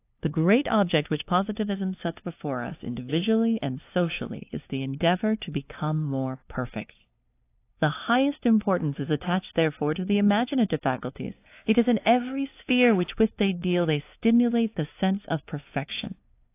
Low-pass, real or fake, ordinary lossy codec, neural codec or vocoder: 3.6 kHz; fake; AAC, 24 kbps; codec, 16 kHz, 2 kbps, FunCodec, trained on LibriTTS, 25 frames a second